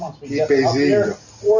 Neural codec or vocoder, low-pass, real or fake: none; 7.2 kHz; real